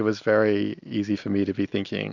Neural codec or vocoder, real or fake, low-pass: none; real; 7.2 kHz